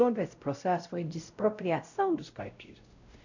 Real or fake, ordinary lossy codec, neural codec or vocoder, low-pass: fake; none; codec, 16 kHz, 0.5 kbps, X-Codec, WavLM features, trained on Multilingual LibriSpeech; 7.2 kHz